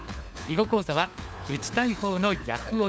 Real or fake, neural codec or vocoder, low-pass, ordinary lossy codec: fake; codec, 16 kHz, 4 kbps, FunCodec, trained on LibriTTS, 50 frames a second; none; none